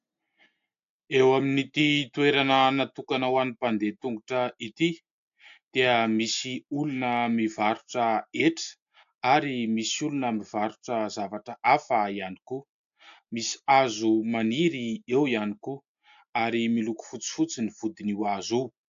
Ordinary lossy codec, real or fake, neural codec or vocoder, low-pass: AAC, 64 kbps; real; none; 7.2 kHz